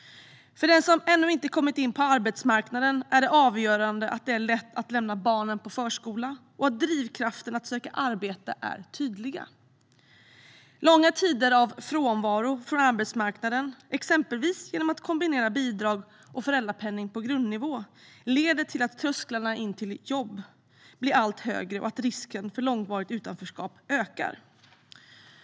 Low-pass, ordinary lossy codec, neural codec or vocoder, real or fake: none; none; none; real